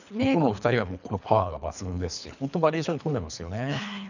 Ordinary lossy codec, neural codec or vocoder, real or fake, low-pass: none; codec, 24 kHz, 3 kbps, HILCodec; fake; 7.2 kHz